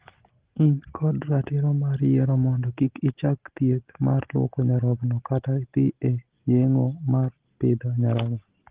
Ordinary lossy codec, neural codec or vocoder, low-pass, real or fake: Opus, 16 kbps; none; 3.6 kHz; real